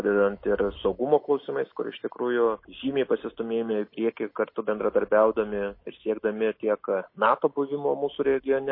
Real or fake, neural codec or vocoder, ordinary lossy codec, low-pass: real; none; MP3, 24 kbps; 5.4 kHz